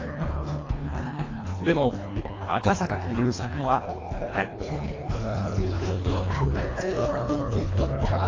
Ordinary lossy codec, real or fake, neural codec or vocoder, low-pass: AAC, 32 kbps; fake; codec, 24 kHz, 1.5 kbps, HILCodec; 7.2 kHz